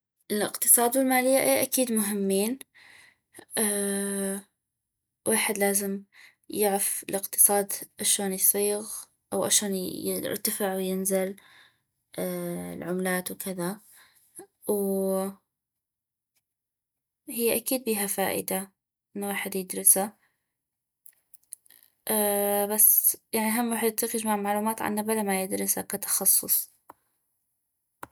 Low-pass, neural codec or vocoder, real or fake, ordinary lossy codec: none; none; real; none